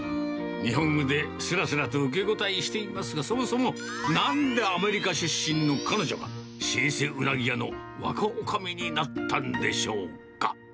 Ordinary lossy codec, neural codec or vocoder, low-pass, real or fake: none; none; none; real